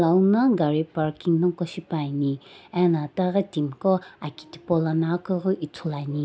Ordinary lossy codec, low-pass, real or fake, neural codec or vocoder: none; none; real; none